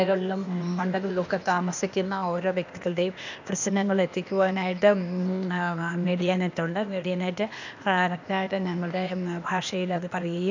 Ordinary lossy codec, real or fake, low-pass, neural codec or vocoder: none; fake; 7.2 kHz; codec, 16 kHz, 0.8 kbps, ZipCodec